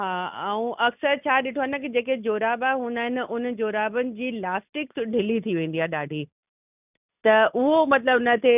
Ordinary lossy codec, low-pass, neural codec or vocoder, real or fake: none; 3.6 kHz; none; real